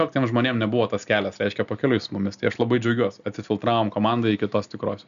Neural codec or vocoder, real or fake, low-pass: none; real; 7.2 kHz